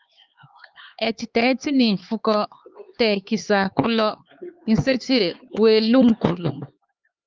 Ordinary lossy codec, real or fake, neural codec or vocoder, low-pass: Opus, 24 kbps; fake; codec, 16 kHz, 4 kbps, X-Codec, HuBERT features, trained on LibriSpeech; 7.2 kHz